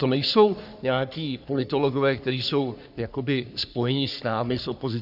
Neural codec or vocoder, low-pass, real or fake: codec, 44.1 kHz, 3.4 kbps, Pupu-Codec; 5.4 kHz; fake